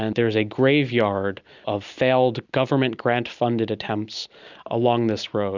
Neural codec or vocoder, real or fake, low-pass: none; real; 7.2 kHz